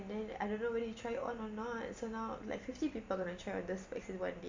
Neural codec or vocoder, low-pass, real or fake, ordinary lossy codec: none; 7.2 kHz; real; MP3, 48 kbps